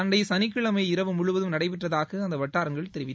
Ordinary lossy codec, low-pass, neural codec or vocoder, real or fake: none; none; none; real